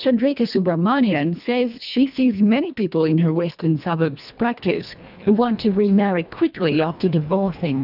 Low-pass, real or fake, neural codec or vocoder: 5.4 kHz; fake; codec, 24 kHz, 1.5 kbps, HILCodec